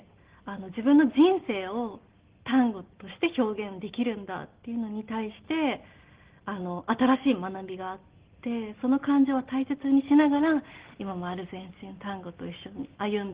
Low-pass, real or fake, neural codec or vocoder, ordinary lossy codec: 3.6 kHz; real; none; Opus, 16 kbps